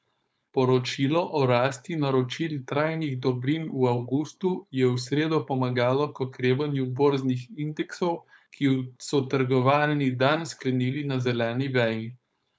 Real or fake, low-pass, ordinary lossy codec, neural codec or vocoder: fake; none; none; codec, 16 kHz, 4.8 kbps, FACodec